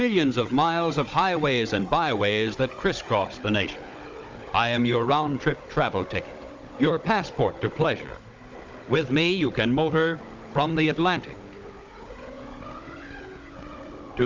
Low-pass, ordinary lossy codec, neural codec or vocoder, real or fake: 7.2 kHz; Opus, 32 kbps; codec, 16 kHz, 16 kbps, FunCodec, trained on Chinese and English, 50 frames a second; fake